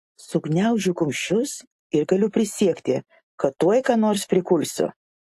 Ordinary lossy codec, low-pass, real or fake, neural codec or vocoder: AAC, 64 kbps; 14.4 kHz; real; none